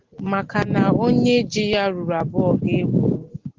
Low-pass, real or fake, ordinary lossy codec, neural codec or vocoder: 7.2 kHz; real; Opus, 16 kbps; none